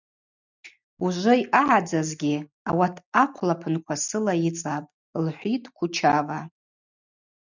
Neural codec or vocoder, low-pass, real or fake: none; 7.2 kHz; real